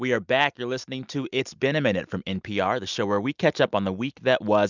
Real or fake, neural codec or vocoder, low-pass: real; none; 7.2 kHz